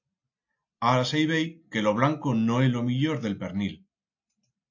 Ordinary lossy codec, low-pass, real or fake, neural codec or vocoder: AAC, 48 kbps; 7.2 kHz; real; none